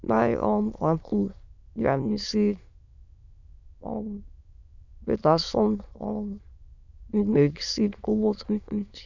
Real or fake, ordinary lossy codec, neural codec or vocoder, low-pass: fake; none; autoencoder, 22.05 kHz, a latent of 192 numbers a frame, VITS, trained on many speakers; 7.2 kHz